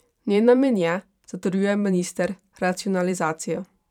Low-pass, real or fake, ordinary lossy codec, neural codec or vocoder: 19.8 kHz; fake; none; vocoder, 44.1 kHz, 128 mel bands every 512 samples, BigVGAN v2